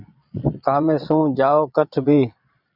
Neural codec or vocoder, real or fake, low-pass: vocoder, 22.05 kHz, 80 mel bands, Vocos; fake; 5.4 kHz